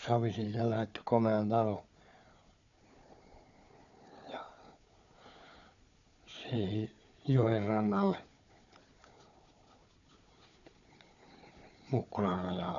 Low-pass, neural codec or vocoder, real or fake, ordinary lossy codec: 7.2 kHz; codec, 16 kHz, 4 kbps, FunCodec, trained on Chinese and English, 50 frames a second; fake; none